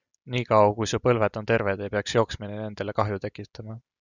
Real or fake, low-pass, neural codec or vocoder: real; 7.2 kHz; none